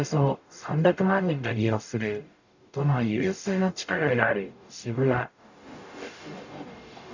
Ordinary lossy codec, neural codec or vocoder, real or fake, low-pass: none; codec, 44.1 kHz, 0.9 kbps, DAC; fake; 7.2 kHz